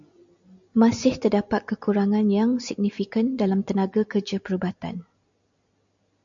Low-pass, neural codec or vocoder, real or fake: 7.2 kHz; none; real